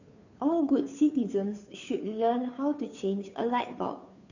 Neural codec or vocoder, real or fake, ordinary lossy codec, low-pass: codec, 16 kHz, 2 kbps, FunCodec, trained on Chinese and English, 25 frames a second; fake; none; 7.2 kHz